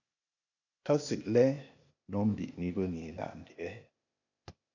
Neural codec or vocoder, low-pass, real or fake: codec, 16 kHz, 0.8 kbps, ZipCodec; 7.2 kHz; fake